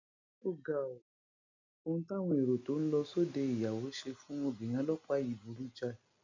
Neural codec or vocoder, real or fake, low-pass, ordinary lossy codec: none; real; 7.2 kHz; MP3, 64 kbps